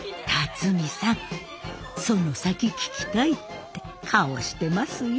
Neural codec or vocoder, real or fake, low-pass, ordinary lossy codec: none; real; none; none